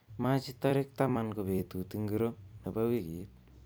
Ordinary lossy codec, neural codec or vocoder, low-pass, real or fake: none; vocoder, 44.1 kHz, 128 mel bands every 256 samples, BigVGAN v2; none; fake